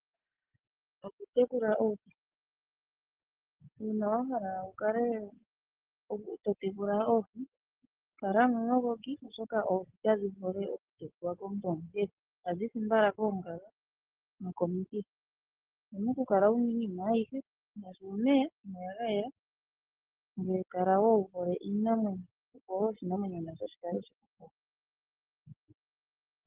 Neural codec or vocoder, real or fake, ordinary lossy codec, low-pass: none; real; Opus, 16 kbps; 3.6 kHz